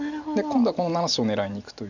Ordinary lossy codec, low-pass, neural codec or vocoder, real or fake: none; 7.2 kHz; none; real